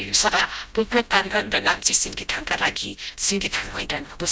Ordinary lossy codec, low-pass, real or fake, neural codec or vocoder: none; none; fake; codec, 16 kHz, 0.5 kbps, FreqCodec, smaller model